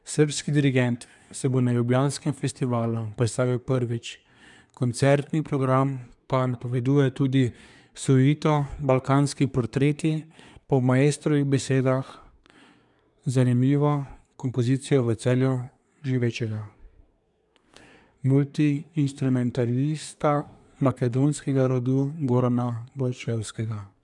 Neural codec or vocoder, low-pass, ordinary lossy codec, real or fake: codec, 24 kHz, 1 kbps, SNAC; 10.8 kHz; none; fake